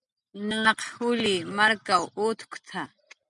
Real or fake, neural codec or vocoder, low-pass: real; none; 10.8 kHz